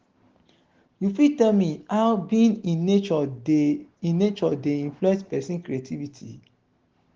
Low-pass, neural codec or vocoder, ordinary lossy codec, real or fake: 7.2 kHz; none; Opus, 16 kbps; real